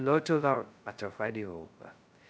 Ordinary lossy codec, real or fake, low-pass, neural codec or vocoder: none; fake; none; codec, 16 kHz, 0.2 kbps, FocalCodec